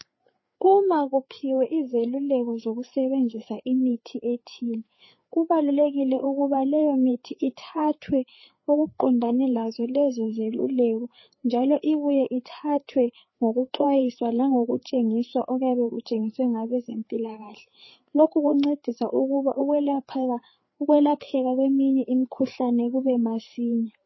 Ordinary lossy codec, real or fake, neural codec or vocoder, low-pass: MP3, 24 kbps; fake; codec, 16 kHz, 4 kbps, FreqCodec, larger model; 7.2 kHz